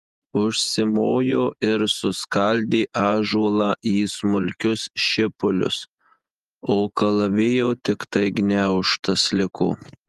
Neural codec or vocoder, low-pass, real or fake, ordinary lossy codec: vocoder, 48 kHz, 128 mel bands, Vocos; 14.4 kHz; fake; Opus, 32 kbps